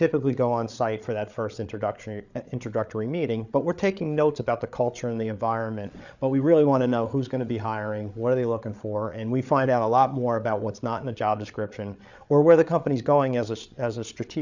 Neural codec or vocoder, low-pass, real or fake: codec, 16 kHz, 8 kbps, FreqCodec, larger model; 7.2 kHz; fake